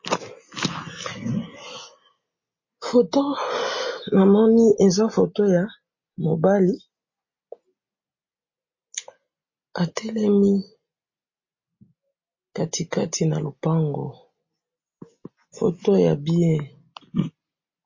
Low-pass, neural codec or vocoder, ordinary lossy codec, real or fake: 7.2 kHz; none; MP3, 32 kbps; real